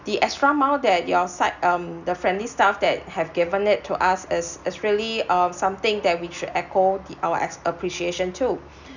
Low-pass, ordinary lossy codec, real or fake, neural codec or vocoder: 7.2 kHz; none; real; none